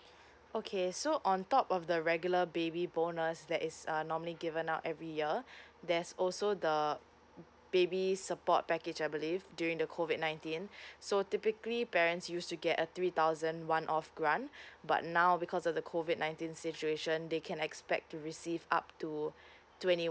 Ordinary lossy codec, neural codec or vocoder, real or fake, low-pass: none; none; real; none